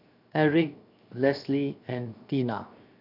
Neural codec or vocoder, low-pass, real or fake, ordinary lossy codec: codec, 16 kHz, 0.7 kbps, FocalCodec; 5.4 kHz; fake; none